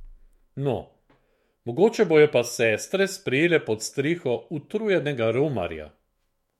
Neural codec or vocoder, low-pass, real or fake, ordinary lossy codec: autoencoder, 48 kHz, 128 numbers a frame, DAC-VAE, trained on Japanese speech; 19.8 kHz; fake; MP3, 64 kbps